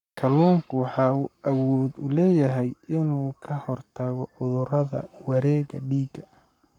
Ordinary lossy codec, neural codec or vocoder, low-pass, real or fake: none; codec, 44.1 kHz, 7.8 kbps, Pupu-Codec; 19.8 kHz; fake